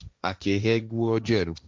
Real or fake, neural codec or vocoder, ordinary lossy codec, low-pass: fake; codec, 16 kHz, 1.1 kbps, Voila-Tokenizer; none; none